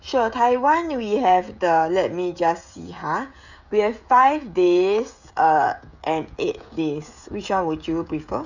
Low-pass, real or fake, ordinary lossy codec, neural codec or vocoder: 7.2 kHz; fake; none; codec, 16 kHz, 16 kbps, FreqCodec, smaller model